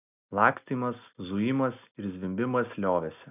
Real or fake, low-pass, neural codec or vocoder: real; 3.6 kHz; none